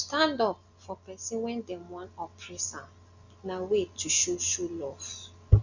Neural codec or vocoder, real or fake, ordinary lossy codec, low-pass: none; real; none; 7.2 kHz